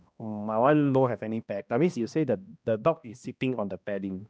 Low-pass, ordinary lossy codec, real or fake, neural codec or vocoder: none; none; fake; codec, 16 kHz, 1 kbps, X-Codec, HuBERT features, trained on balanced general audio